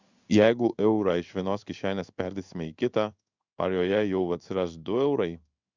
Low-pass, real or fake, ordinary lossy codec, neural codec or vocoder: 7.2 kHz; fake; Opus, 64 kbps; codec, 16 kHz in and 24 kHz out, 1 kbps, XY-Tokenizer